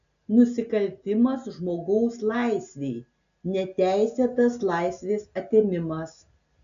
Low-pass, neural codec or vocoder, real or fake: 7.2 kHz; none; real